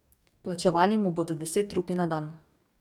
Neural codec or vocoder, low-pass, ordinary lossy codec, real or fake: codec, 44.1 kHz, 2.6 kbps, DAC; 19.8 kHz; none; fake